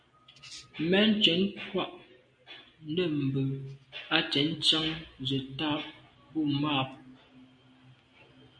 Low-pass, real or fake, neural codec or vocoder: 9.9 kHz; real; none